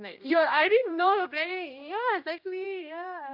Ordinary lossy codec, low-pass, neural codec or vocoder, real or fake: none; 5.4 kHz; codec, 16 kHz, 1 kbps, X-Codec, HuBERT features, trained on general audio; fake